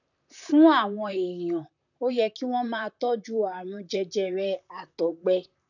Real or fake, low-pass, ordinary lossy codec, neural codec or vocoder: fake; 7.2 kHz; none; vocoder, 44.1 kHz, 128 mel bands, Pupu-Vocoder